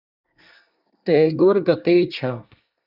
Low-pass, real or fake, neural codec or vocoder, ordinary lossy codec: 5.4 kHz; fake; codec, 24 kHz, 1 kbps, SNAC; Opus, 64 kbps